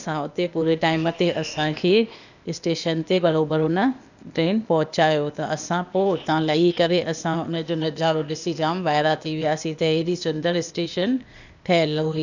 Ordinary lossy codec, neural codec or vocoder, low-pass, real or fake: none; codec, 16 kHz, 0.8 kbps, ZipCodec; 7.2 kHz; fake